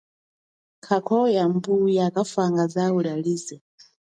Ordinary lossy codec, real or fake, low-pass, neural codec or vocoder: MP3, 96 kbps; real; 9.9 kHz; none